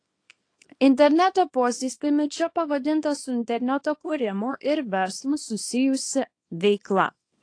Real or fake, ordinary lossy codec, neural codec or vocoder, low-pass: fake; AAC, 48 kbps; codec, 24 kHz, 0.9 kbps, WavTokenizer, small release; 9.9 kHz